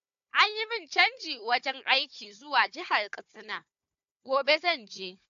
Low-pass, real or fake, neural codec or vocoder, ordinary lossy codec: 7.2 kHz; fake; codec, 16 kHz, 4 kbps, FunCodec, trained on Chinese and English, 50 frames a second; AAC, 64 kbps